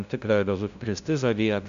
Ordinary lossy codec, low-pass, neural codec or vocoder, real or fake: AAC, 96 kbps; 7.2 kHz; codec, 16 kHz, 0.5 kbps, FunCodec, trained on LibriTTS, 25 frames a second; fake